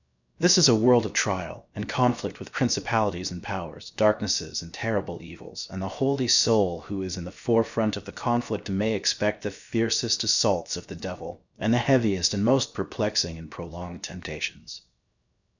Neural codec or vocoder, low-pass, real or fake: codec, 16 kHz, 0.7 kbps, FocalCodec; 7.2 kHz; fake